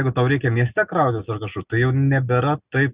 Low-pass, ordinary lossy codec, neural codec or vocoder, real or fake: 3.6 kHz; Opus, 64 kbps; none; real